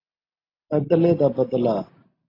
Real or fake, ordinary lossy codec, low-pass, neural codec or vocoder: real; AAC, 24 kbps; 5.4 kHz; none